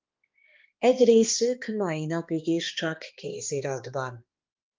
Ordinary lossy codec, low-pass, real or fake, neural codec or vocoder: Opus, 24 kbps; 7.2 kHz; fake; codec, 16 kHz, 2 kbps, X-Codec, HuBERT features, trained on balanced general audio